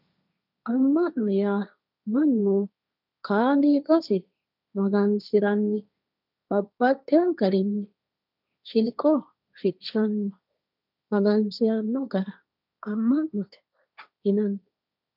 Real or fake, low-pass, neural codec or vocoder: fake; 5.4 kHz; codec, 16 kHz, 1.1 kbps, Voila-Tokenizer